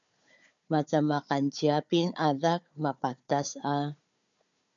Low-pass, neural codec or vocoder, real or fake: 7.2 kHz; codec, 16 kHz, 4 kbps, FunCodec, trained on Chinese and English, 50 frames a second; fake